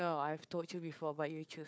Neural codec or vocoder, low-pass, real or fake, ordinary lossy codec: codec, 16 kHz, 4 kbps, FunCodec, trained on Chinese and English, 50 frames a second; none; fake; none